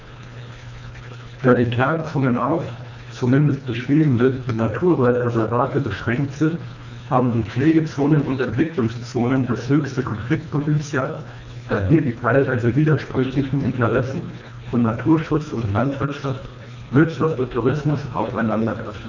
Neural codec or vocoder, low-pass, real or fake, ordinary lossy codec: codec, 24 kHz, 1.5 kbps, HILCodec; 7.2 kHz; fake; none